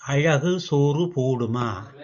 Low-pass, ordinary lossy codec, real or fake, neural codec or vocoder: 7.2 kHz; MP3, 96 kbps; real; none